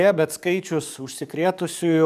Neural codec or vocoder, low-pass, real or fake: codec, 44.1 kHz, 7.8 kbps, DAC; 14.4 kHz; fake